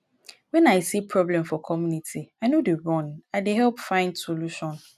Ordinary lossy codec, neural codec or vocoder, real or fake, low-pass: none; none; real; 14.4 kHz